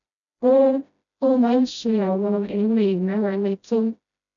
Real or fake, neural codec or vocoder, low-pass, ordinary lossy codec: fake; codec, 16 kHz, 0.5 kbps, FreqCodec, smaller model; 7.2 kHz; none